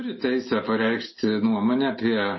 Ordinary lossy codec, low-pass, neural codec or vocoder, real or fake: MP3, 24 kbps; 7.2 kHz; none; real